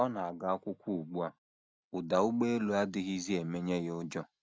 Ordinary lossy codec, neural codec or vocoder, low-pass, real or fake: none; none; none; real